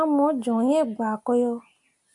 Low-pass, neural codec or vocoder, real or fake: 10.8 kHz; none; real